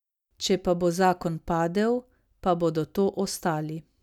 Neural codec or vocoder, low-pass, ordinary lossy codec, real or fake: none; 19.8 kHz; none; real